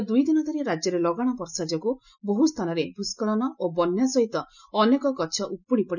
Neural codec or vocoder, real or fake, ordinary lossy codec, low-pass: none; real; none; 7.2 kHz